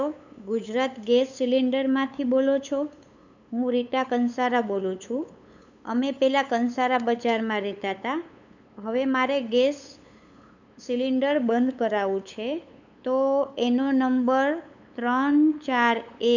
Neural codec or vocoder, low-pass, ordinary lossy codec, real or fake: codec, 16 kHz, 8 kbps, FunCodec, trained on LibriTTS, 25 frames a second; 7.2 kHz; none; fake